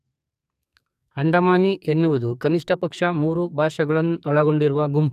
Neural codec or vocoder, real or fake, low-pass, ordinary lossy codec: codec, 44.1 kHz, 2.6 kbps, SNAC; fake; 14.4 kHz; none